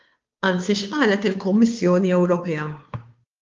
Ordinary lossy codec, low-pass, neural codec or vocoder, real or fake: Opus, 32 kbps; 7.2 kHz; codec, 16 kHz, 2 kbps, FunCodec, trained on Chinese and English, 25 frames a second; fake